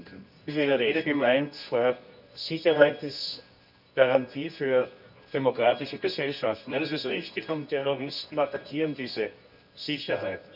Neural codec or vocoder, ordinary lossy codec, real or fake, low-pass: codec, 24 kHz, 0.9 kbps, WavTokenizer, medium music audio release; none; fake; 5.4 kHz